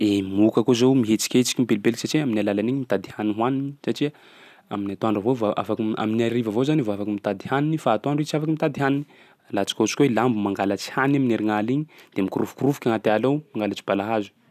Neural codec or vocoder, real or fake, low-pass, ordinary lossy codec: none; real; 14.4 kHz; none